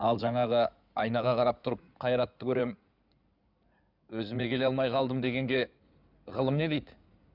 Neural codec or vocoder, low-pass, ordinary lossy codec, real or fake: codec, 16 kHz in and 24 kHz out, 2.2 kbps, FireRedTTS-2 codec; 5.4 kHz; none; fake